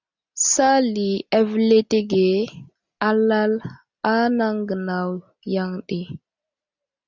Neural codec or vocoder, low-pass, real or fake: none; 7.2 kHz; real